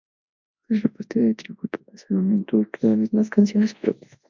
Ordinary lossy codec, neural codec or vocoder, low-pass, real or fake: MP3, 64 kbps; codec, 24 kHz, 0.9 kbps, WavTokenizer, large speech release; 7.2 kHz; fake